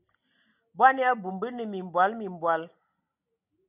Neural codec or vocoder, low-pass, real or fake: none; 3.6 kHz; real